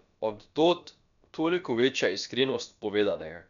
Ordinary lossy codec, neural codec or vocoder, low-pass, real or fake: none; codec, 16 kHz, about 1 kbps, DyCAST, with the encoder's durations; 7.2 kHz; fake